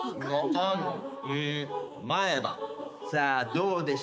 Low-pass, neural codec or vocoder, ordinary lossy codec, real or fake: none; codec, 16 kHz, 4 kbps, X-Codec, HuBERT features, trained on balanced general audio; none; fake